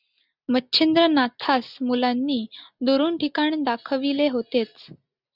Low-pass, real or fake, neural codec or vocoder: 5.4 kHz; real; none